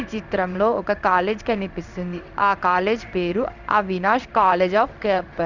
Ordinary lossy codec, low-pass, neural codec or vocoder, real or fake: none; 7.2 kHz; codec, 16 kHz in and 24 kHz out, 1 kbps, XY-Tokenizer; fake